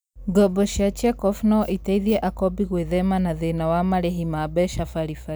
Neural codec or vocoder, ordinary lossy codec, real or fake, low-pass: none; none; real; none